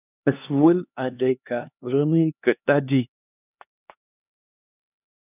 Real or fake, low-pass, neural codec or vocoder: fake; 3.6 kHz; codec, 16 kHz, 2 kbps, X-Codec, HuBERT features, trained on LibriSpeech